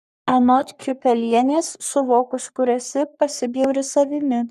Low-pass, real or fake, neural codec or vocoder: 14.4 kHz; fake; codec, 44.1 kHz, 3.4 kbps, Pupu-Codec